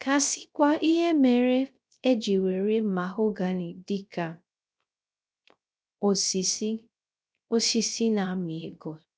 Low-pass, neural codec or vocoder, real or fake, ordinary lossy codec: none; codec, 16 kHz, 0.3 kbps, FocalCodec; fake; none